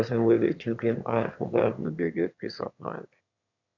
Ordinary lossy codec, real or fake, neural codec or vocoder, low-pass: none; fake; autoencoder, 22.05 kHz, a latent of 192 numbers a frame, VITS, trained on one speaker; 7.2 kHz